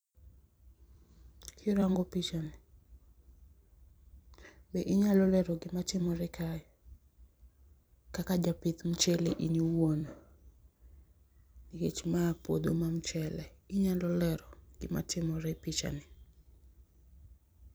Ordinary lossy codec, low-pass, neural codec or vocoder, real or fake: none; none; vocoder, 44.1 kHz, 128 mel bands every 256 samples, BigVGAN v2; fake